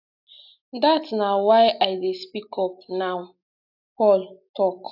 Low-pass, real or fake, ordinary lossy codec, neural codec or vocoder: 5.4 kHz; real; AAC, 48 kbps; none